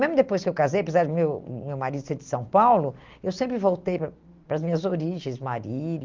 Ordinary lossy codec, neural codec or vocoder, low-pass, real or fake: Opus, 24 kbps; none; 7.2 kHz; real